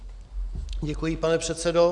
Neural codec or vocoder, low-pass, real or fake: none; 10.8 kHz; real